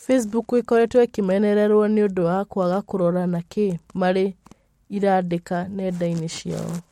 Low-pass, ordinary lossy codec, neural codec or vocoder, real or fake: 19.8 kHz; MP3, 64 kbps; none; real